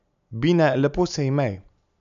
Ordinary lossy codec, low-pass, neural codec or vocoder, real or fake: none; 7.2 kHz; none; real